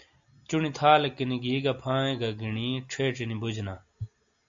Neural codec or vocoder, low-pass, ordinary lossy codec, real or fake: none; 7.2 kHz; AAC, 64 kbps; real